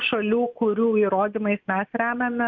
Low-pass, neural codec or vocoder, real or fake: 7.2 kHz; none; real